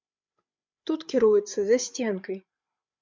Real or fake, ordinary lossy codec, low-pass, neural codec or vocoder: fake; MP3, 64 kbps; 7.2 kHz; codec, 16 kHz, 8 kbps, FreqCodec, larger model